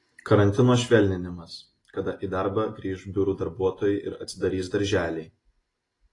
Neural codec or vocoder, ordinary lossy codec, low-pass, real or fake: none; AAC, 32 kbps; 10.8 kHz; real